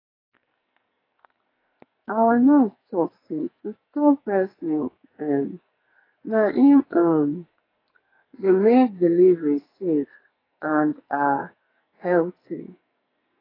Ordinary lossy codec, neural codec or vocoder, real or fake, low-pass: AAC, 24 kbps; codec, 44.1 kHz, 2.6 kbps, SNAC; fake; 5.4 kHz